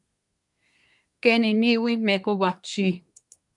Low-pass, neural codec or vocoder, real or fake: 10.8 kHz; codec, 24 kHz, 1 kbps, SNAC; fake